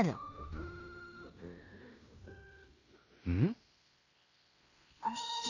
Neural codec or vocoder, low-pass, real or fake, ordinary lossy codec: codec, 16 kHz in and 24 kHz out, 0.9 kbps, LongCat-Audio-Codec, fine tuned four codebook decoder; 7.2 kHz; fake; none